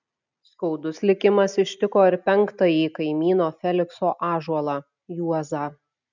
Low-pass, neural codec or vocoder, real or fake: 7.2 kHz; none; real